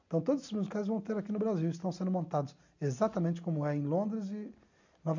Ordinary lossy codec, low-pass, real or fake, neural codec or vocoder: none; 7.2 kHz; real; none